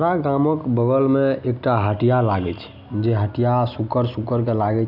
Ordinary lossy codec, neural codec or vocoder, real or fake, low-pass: none; none; real; 5.4 kHz